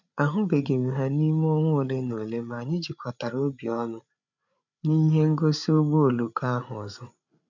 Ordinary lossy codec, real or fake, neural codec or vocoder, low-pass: none; fake; codec, 16 kHz, 8 kbps, FreqCodec, larger model; 7.2 kHz